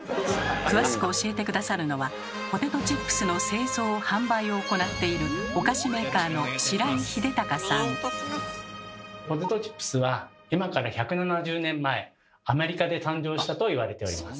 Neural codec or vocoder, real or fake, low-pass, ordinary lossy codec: none; real; none; none